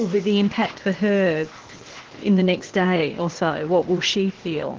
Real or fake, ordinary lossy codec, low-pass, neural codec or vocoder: fake; Opus, 16 kbps; 7.2 kHz; codec, 16 kHz, 0.8 kbps, ZipCodec